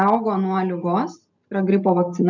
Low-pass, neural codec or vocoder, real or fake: 7.2 kHz; none; real